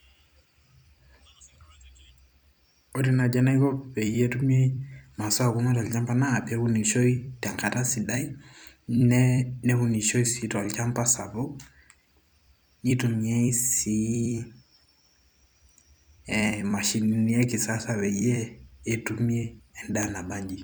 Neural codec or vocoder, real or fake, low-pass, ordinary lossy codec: vocoder, 44.1 kHz, 128 mel bands every 256 samples, BigVGAN v2; fake; none; none